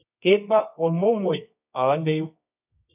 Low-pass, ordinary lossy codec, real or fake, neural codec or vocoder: 3.6 kHz; none; fake; codec, 24 kHz, 0.9 kbps, WavTokenizer, medium music audio release